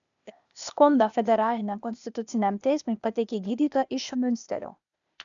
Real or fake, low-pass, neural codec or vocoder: fake; 7.2 kHz; codec, 16 kHz, 0.8 kbps, ZipCodec